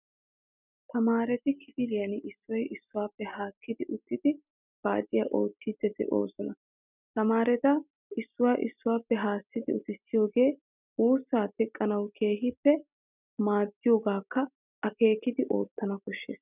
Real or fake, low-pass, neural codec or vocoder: real; 3.6 kHz; none